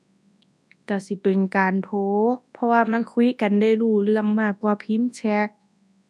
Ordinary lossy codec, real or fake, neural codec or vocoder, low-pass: none; fake; codec, 24 kHz, 0.9 kbps, WavTokenizer, large speech release; none